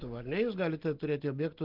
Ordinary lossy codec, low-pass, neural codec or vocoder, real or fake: Opus, 16 kbps; 5.4 kHz; none; real